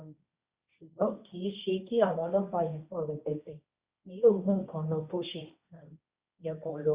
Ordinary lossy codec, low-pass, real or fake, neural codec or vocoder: Opus, 64 kbps; 3.6 kHz; fake; codec, 16 kHz, 1.1 kbps, Voila-Tokenizer